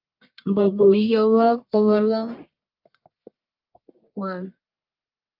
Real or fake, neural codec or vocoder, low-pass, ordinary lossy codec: fake; codec, 44.1 kHz, 1.7 kbps, Pupu-Codec; 5.4 kHz; Opus, 24 kbps